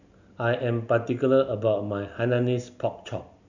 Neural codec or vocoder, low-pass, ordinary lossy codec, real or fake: none; 7.2 kHz; none; real